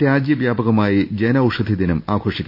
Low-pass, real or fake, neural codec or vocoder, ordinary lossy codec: 5.4 kHz; real; none; none